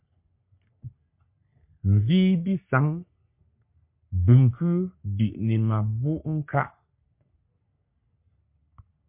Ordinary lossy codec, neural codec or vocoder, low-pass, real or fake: MP3, 32 kbps; codec, 44.1 kHz, 3.4 kbps, Pupu-Codec; 3.6 kHz; fake